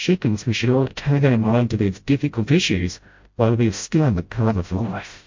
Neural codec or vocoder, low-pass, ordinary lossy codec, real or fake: codec, 16 kHz, 0.5 kbps, FreqCodec, smaller model; 7.2 kHz; MP3, 48 kbps; fake